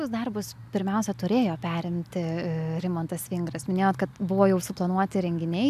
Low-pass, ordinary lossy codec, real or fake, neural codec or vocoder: 14.4 kHz; AAC, 96 kbps; real; none